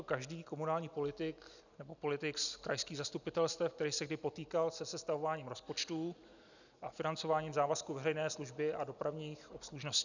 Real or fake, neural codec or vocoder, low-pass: real; none; 7.2 kHz